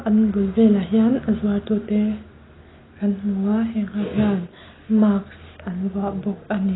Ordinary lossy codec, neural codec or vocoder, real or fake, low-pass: AAC, 16 kbps; none; real; 7.2 kHz